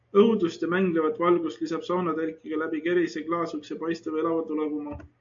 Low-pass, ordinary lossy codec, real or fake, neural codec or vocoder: 7.2 kHz; MP3, 48 kbps; real; none